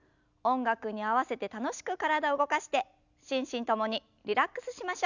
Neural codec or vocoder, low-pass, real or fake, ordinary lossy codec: none; 7.2 kHz; real; none